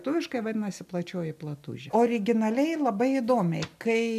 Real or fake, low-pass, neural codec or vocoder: real; 14.4 kHz; none